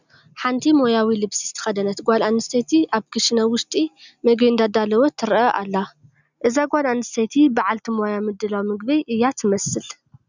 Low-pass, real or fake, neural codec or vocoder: 7.2 kHz; real; none